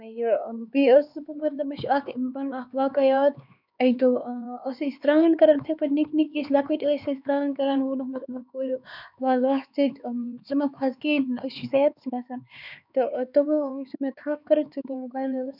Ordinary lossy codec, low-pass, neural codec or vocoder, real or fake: none; 5.4 kHz; codec, 16 kHz, 4 kbps, X-Codec, HuBERT features, trained on LibriSpeech; fake